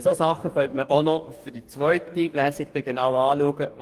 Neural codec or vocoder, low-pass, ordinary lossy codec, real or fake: codec, 44.1 kHz, 2.6 kbps, DAC; 14.4 kHz; Opus, 24 kbps; fake